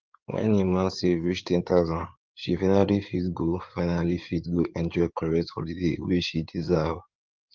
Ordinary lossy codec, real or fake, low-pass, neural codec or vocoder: Opus, 24 kbps; fake; 7.2 kHz; codec, 16 kHz in and 24 kHz out, 2.2 kbps, FireRedTTS-2 codec